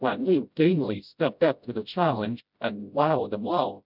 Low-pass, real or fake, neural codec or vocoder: 5.4 kHz; fake; codec, 16 kHz, 0.5 kbps, FreqCodec, smaller model